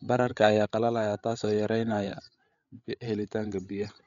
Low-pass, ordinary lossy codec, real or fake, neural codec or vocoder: 7.2 kHz; none; fake; codec, 16 kHz, 8 kbps, FreqCodec, larger model